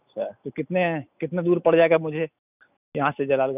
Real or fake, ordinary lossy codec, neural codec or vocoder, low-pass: real; none; none; 3.6 kHz